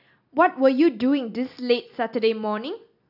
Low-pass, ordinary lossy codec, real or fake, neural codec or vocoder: 5.4 kHz; none; real; none